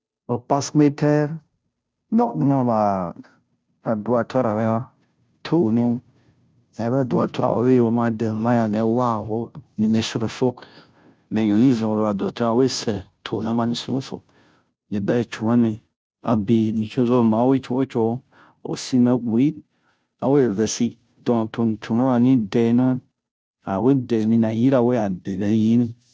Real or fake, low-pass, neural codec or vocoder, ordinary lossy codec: fake; none; codec, 16 kHz, 0.5 kbps, FunCodec, trained on Chinese and English, 25 frames a second; none